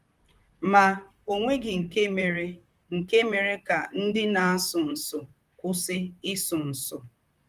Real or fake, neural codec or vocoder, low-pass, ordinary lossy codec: fake; vocoder, 44.1 kHz, 128 mel bands, Pupu-Vocoder; 14.4 kHz; Opus, 32 kbps